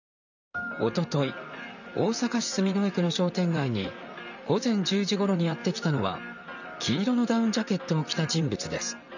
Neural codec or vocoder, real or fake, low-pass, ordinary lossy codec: vocoder, 44.1 kHz, 128 mel bands, Pupu-Vocoder; fake; 7.2 kHz; none